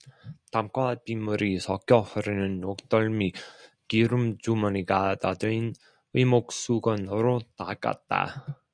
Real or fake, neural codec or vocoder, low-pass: real; none; 9.9 kHz